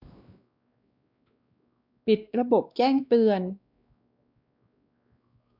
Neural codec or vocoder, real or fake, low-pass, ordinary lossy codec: codec, 16 kHz, 2 kbps, X-Codec, WavLM features, trained on Multilingual LibriSpeech; fake; 5.4 kHz; none